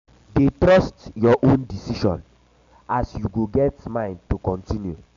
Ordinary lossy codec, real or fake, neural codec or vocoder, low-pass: MP3, 64 kbps; real; none; 7.2 kHz